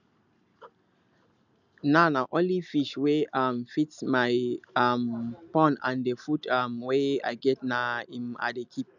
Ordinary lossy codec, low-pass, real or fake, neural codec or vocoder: none; 7.2 kHz; real; none